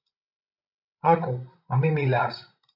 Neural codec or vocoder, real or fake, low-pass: codec, 16 kHz, 16 kbps, FreqCodec, larger model; fake; 5.4 kHz